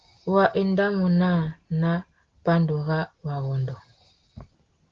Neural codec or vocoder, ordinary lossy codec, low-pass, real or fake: none; Opus, 16 kbps; 7.2 kHz; real